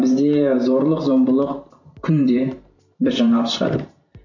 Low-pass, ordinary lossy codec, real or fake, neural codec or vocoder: 7.2 kHz; none; fake; vocoder, 44.1 kHz, 128 mel bands every 512 samples, BigVGAN v2